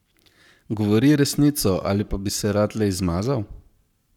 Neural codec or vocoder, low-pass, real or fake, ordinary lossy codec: codec, 44.1 kHz, 7.8 kbps, Pupu-Codec; 19.8 kHz; fake; none